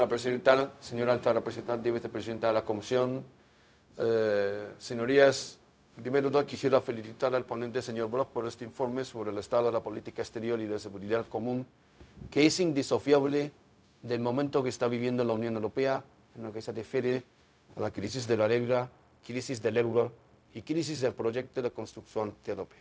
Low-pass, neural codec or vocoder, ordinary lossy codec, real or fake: none; codec, 16 kHz, 0.4 kbps, LongCat-Audio-Codec; none; fake